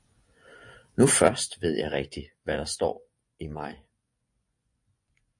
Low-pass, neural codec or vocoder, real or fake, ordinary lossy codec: 10.8 kHz; vocoder, 24 kHz, 100 mel bands, Vocos; fake; MP3, 48 kbps